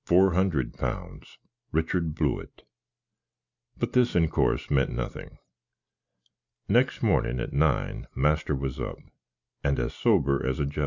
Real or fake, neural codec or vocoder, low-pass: real; none; 7.2 kHz